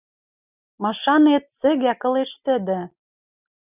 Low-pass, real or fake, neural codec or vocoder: 3.6 kHz; real; none